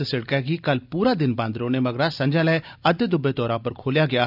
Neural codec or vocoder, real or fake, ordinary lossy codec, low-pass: none; real; none; 5.4 kHz